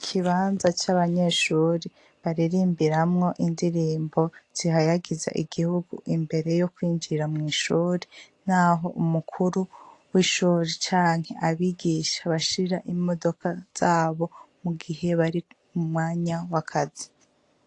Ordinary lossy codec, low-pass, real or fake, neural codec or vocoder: AAC, 48 kbps; 10.8 kHz; real; none